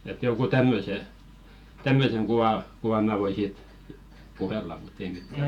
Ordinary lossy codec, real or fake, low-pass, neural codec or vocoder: none; fake; 19.8 kHz; vocoder, 48 kHz, 128 mel bands, Vocos